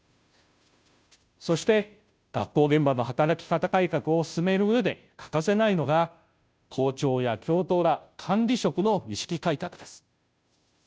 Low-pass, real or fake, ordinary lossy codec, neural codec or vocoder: none; fake; none; codec, 16 kHz, 0.5 kbps, FunCodec, trained on Chinese and English, 25 frames a second